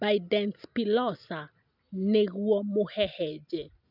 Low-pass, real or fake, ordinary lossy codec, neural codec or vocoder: 5.4 kHz; real; none; none